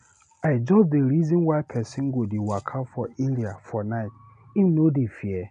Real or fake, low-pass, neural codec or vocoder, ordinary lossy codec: real; 9.9 kHz; none; none